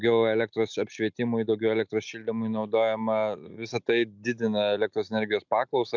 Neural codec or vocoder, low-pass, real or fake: none; 7.2 kHz; real